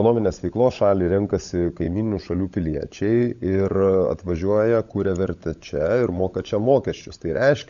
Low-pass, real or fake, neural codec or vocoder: 7.2 kHz; fake; codec, 16 kHz, 16 kbps, FunCodec, trained on LibriTTS, 50 frames a second